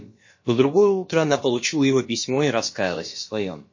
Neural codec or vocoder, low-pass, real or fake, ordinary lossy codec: codec, 16 kHz, about 1 kbps, DyCAST, with the encoder's durations; 7.2 kHz; fake; MP3, 32 kbps